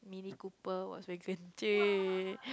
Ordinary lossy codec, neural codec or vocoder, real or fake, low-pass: none; none; real; none